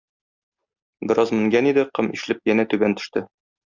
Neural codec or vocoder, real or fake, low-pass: none; real; 7.2 kHz